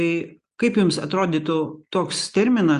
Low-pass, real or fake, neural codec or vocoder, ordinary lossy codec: 10.8 kHz; real; none; Opus, 64 kbps